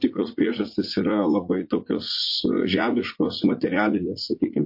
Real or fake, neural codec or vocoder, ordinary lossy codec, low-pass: fake; vocoder, 44.1 kHz, 80 mel bands, Vocos; MP3, 32 kbps; 5.4 kHz